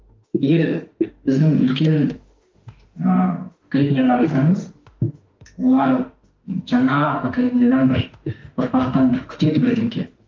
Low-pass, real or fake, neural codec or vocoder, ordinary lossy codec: 7.2 kHz; fake; autoencoder, 48 kHz, 32 numbers a frame, DAC-VAE, trained on Japanese speech; Opus, 32 kbps